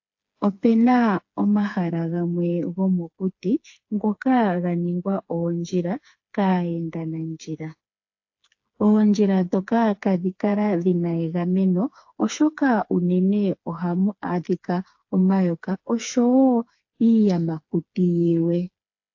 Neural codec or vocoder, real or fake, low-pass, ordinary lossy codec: codec, 16 kHz, 4 kbps, FreqCodec, smaller model; fake; 7.2 kHz; AAC, 48 kbps